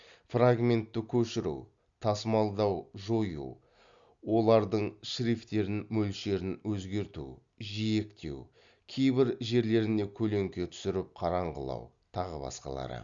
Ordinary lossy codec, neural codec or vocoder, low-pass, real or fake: Opus, 64 kbps; none; 7.2 kHz; real